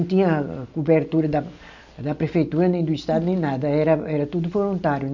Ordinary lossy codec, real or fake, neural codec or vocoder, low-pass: none; real; none; 7.2 kHz